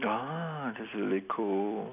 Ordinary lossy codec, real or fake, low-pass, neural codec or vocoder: none; real; 3.6 kHz; none